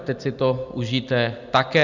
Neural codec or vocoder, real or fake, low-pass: none; real; 7.2 kHz